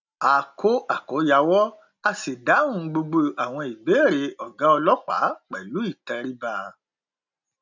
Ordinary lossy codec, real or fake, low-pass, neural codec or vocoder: none; real; 7.2 kHz; none